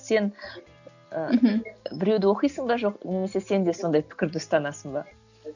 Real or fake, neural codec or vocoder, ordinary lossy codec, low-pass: real; none; none; 7.2 kHz